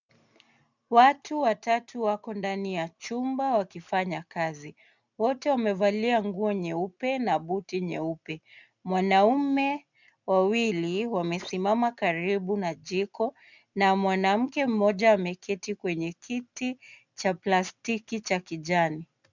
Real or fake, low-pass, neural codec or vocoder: real; 7.2 kHz; none